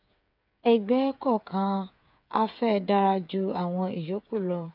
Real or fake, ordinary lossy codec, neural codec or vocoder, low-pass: fake; MP3, 48 kbps; codec, 16 kHz, 8 kbps, FreqCodec, smaller model; 5.4 kHz